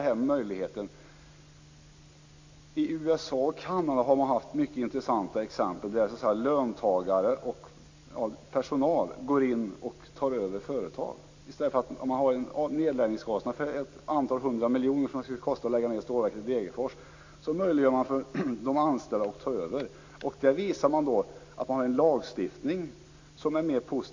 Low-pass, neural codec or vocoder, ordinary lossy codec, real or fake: 7.2 kHz; none; AAC, 48 kbps; real